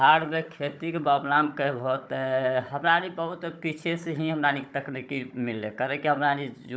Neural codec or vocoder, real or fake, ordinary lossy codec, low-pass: codec, 16 kHz, 16 kbps, FunCodec, trained on Chinese and English, 50 frames a second; fake; none; none